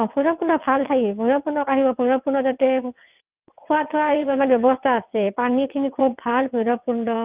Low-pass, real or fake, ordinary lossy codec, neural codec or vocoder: 3.6 kHz; fake; Opus, 24 kbps; vocoder, 22.05 kHz, 80 mel bands, WaveNeXt